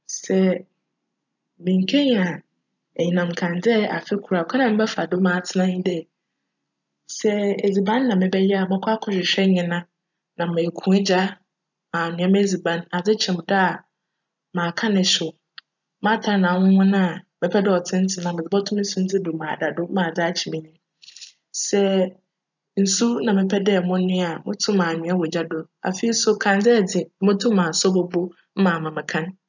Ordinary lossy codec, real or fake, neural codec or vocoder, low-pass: none; real; none; 7.2 kHz